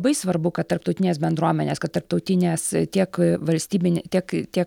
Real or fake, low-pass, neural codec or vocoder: real; 19.8 kHz; none